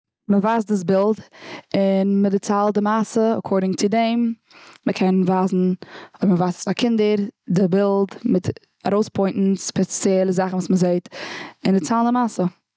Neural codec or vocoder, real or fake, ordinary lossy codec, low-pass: none; real; none; none